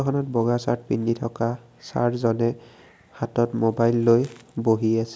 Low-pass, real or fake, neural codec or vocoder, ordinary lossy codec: none; real; none; none